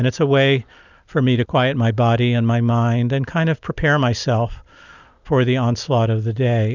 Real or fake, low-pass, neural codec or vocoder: real; 7.2 kHz; none